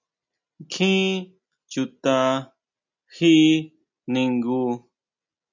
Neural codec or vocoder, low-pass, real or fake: none; 7.2 kHz; real